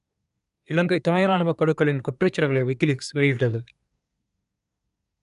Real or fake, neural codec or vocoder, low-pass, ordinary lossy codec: fake; codec, 24 kHz, 1 kbps, SNAC; 10.8 kHz; none